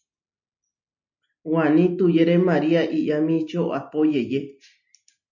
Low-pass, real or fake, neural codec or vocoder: 7.2 kHz; real; none